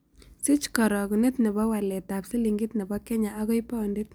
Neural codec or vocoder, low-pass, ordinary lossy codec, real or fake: none; none; none; real